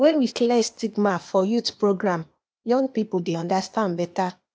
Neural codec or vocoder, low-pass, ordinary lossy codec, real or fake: codec, 16 kHz, 0.8 kbps, ZipCodec; none; none; fake